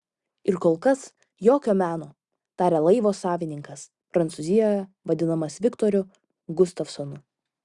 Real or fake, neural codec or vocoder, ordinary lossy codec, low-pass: real; none; Opus, 64 kbps; 10.8 kHz